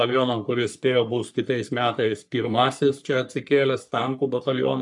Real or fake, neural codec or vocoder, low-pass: fake; codec, 44.1 kHz, 3.4 kbps, Pupu-Codec; 10.8 kHz